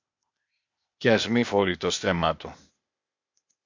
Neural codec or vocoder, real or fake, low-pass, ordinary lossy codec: codec, 16 kHz, 0.8 kbps, ZipCodec; fake; 7.2 kHz; MP3, 48 kbps